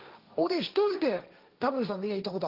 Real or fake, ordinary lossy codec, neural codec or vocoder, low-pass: fake; Opus, 32 kbps; codec, 16 kHz, 1.1 kbps, Voila-Tokenizer; 5.4 kHz